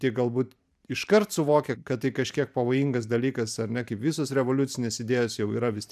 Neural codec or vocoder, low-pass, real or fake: vocoder, 44.1 kHz, 128 mel bands every 512 samples, BigVGAN v2; 14.4 kHz; fake